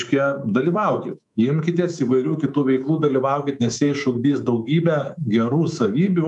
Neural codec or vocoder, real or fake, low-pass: codec, 24 kHz, 3.1 kbps, DualCodec; fake; 10.8 kHz